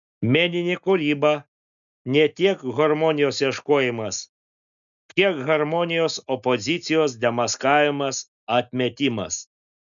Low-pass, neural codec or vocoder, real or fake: 7.2 kHz; none; real